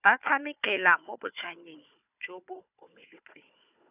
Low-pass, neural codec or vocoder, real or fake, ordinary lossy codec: 3.6 kHz; codec, 16 kHz, 4 kbps, FunCodec, trained on Chinese and English, 50 frames a second; fake; none